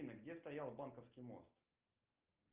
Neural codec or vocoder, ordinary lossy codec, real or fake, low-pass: none; Opus, 24 kbps; real; 3.6 kHz